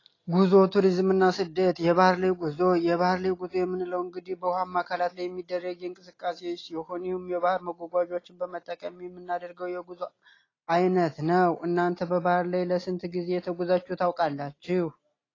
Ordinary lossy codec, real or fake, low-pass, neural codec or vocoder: AAC, 32 kbps; real; 7.2 kHz; none